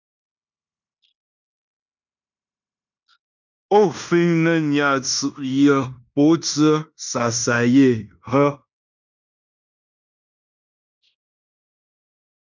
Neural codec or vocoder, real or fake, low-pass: codec, 16 kHz in and 24 kHz out, 0.9 kbps, LongCat-Audio-Codec, fine tuned four codebook decoder; fake; 7.2 kHz